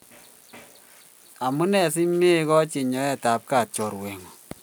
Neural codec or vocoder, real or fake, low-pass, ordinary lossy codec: none; real; none; none